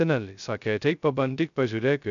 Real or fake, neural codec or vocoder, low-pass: fake; codec, 16 kHz, 0.2 kbps, FocalCodec; 7.2 kHz